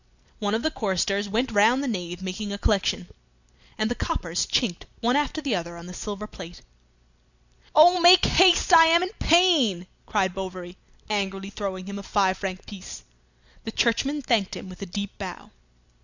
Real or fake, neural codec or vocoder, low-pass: real; none; 7.2 kHz